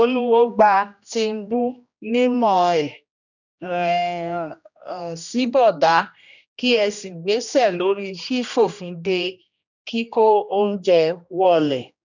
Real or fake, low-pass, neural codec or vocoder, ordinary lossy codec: fake; 7.2 kHz; codec, 16 kHz, 1 kbps, X-Codec, HuBERT features, trained on general audio; none